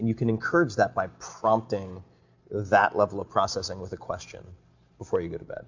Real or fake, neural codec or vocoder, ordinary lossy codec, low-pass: real; none; MP3, 48 kbps; 7.2 kHz